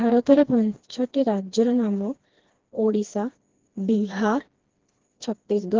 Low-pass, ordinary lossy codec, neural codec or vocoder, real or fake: 7.2 kHz; Opus, 16 kbps; codec, 16 kHz, 2 kbps, FreqCodec, smaller model; fake